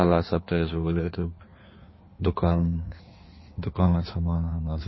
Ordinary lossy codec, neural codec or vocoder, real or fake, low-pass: MP3, 24 kbps; codec, 16 kHz in and 24 kHz out, 1.1 kbps, FireRedTTS-2 codec; fake; 7.2 kHz